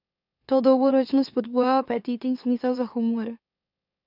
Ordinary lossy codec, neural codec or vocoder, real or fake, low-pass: none; autoencoder, 44.1 kHz, a latent of 192 numbers a frame, MeloTTS; fake; 5.4 kHz